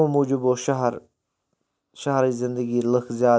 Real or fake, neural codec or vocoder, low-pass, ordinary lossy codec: real; none; none; none